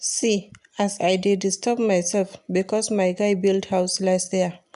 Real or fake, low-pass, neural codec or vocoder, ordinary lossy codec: real; 10.8 kHz; none; none